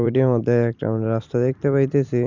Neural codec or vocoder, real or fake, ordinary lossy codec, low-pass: vocoder, 44.1 kHz, 128 mel bands every 256 samples, BigVGAN v2; fake; Opus, 64 kbps; 7.2 kHz